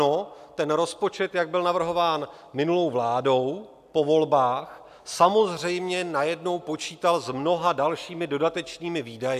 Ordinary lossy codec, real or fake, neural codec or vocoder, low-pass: AAC, 96 kbps; real; none; 14.4 kHz